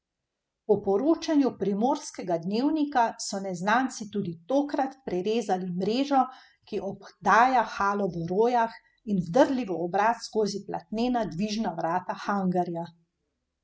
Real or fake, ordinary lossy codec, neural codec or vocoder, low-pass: real; none; none; none